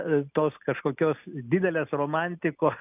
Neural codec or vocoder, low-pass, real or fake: none; 3.6 kHz; real